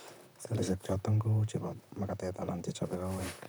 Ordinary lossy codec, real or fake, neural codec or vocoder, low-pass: none; fake; vocoder, 44.1 kHz, 128 mel bands, Pupu-Vocoder; none